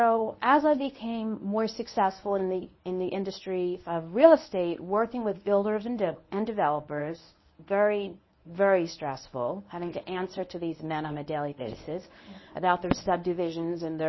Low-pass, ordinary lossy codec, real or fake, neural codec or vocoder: 7.2 kHz; MP3, 24 kbps; fake; codec, 24 kHz, 0.9 kbps, WavTokenizer, medium speech release version 1